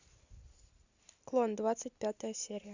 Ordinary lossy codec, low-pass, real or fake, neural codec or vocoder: Opus, 64 kbps; 7.2 kHz; real; none